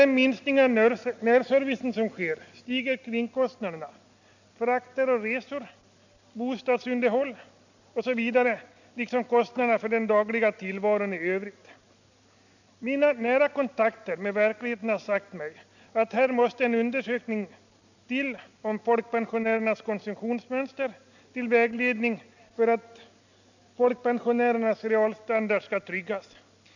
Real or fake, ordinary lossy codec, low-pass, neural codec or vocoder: real; none; 7.2 kHz; none